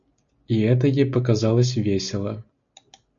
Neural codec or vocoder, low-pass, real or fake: none; 7.2 kHz; real